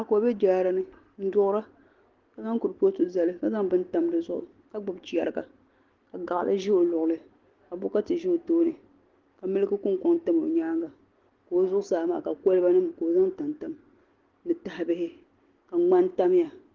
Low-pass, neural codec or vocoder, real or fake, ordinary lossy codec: 7.2 kHz; none; real; Opus, 16 kbps